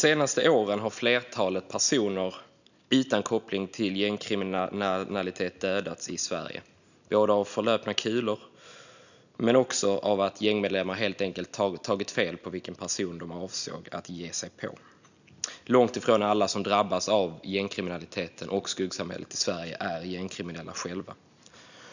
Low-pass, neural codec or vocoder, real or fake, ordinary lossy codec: 7.2 kHz; none; real; none